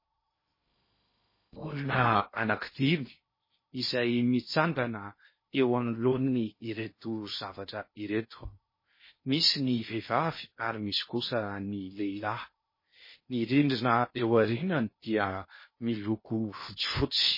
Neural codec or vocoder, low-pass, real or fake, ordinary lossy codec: codec, 16 kHz in and 24 kHz out, 0.6 kbps, FocalCodec, streaming, 2048 codes; 5.4 kHz; fake; MP3, 24 kbps